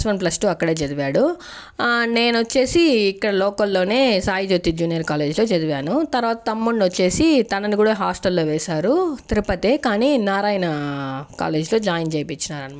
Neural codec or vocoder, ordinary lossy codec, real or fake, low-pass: none; none; real; none